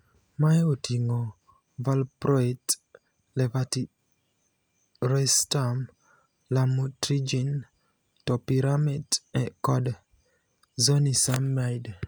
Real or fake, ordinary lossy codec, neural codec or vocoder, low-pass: real; none; none; none